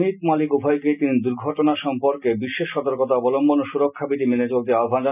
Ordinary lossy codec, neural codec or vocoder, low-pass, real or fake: none; none; 3.6 kHz; real